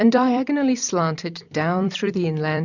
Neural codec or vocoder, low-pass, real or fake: vocoder, 44.1 kHz, 128 mel bands every 256 samples, BigVGAN v2; 7.2 kHz; fake